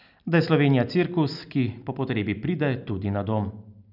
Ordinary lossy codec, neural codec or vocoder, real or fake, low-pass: none; none; real; 5.4 kHz